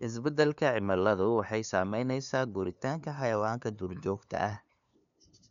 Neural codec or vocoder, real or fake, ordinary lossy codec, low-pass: codec, 16 kHz, 2 kbps, FunCodec, trained on LibriTTS, 25 frames a second; fake; none; 7.2 kHz